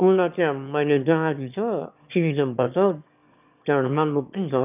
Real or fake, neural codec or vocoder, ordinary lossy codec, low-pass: fake; autoencoder, 22.05 kHz, a latent of 192 numbers a frame, VITS, trained on one speaker; none; 3.6 kHz